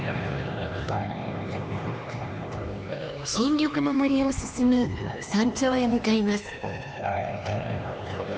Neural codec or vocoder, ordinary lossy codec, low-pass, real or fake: codec, 16 kHz, 2 kbps, X-Codec, HuBERT features, trained on LibriSpeech; none; none; fake